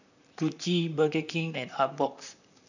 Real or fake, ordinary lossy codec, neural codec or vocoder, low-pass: fake; none; vocoder, 44.1 kHz, 128 mel bands, Pupu-Vocoder; 7.2 kHz